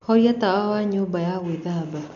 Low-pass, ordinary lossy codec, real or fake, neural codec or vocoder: 7.2 kHz; none; real; none